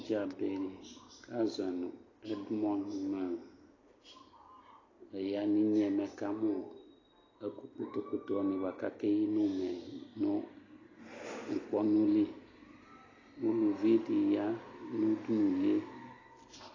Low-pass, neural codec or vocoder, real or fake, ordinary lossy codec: 7.2 kHz; none; real; AAC, 32 kbps